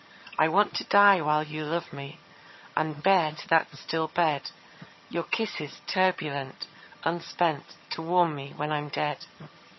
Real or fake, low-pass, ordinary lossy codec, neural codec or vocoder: fake; 7.2 kHz; MP3, 24 kbps; vocoder, 22.05 kHz, 80 mel bands, HiFi-GAN